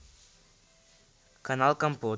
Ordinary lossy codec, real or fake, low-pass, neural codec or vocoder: none; real; none; none